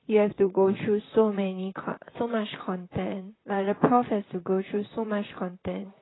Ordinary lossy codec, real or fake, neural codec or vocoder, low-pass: AAC, 16 kbps; fake; vocoder, 44.1 kHz, 128 mel bands, Pupu-Vocoder; 7.2 kHz